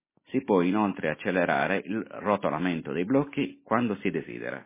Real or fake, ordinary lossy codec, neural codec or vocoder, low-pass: real; MP3, 16 kbps; none; 3.6 kHz